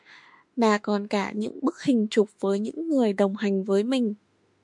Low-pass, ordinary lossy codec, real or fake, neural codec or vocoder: 10.8 kHz; MP3, 64 kbps; fake; autoencoder, 48 kHz, 32 numbers a frame, DAC-VAE, trained on Japanese speech